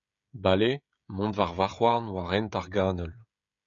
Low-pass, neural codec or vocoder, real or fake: 7.2 kHz; codec, 16 kHz, 16 kbps, FreqCodec, smaller model; fake